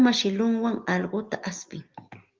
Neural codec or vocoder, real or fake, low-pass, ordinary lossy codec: none; real; 7.2 kHz; Opus, 32 kbps